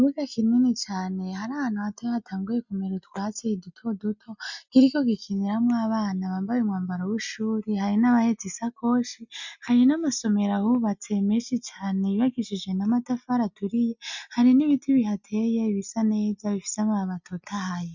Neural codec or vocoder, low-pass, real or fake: none; 7.2 kHz; real